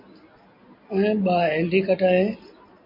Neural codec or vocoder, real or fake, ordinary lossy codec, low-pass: none; real; MP3, 32 kbps; 5.4 kHz